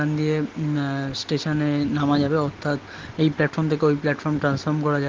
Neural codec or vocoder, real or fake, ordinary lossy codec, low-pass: none; real; Opus, 16 kbps; 7.2 kHz